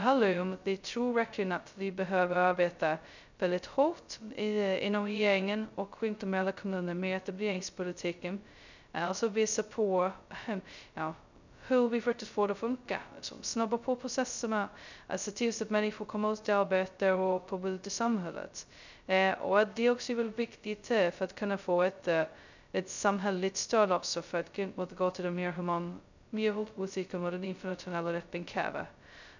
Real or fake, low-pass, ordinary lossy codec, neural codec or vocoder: fake; 7.2 kHz; none; codec, 16 kHz, 0.2 kbps, FocalCodec